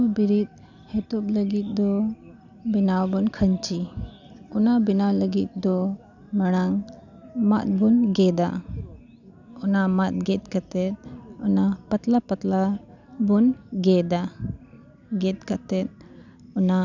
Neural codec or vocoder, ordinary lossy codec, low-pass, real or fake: none; none; 7.2 kHz; real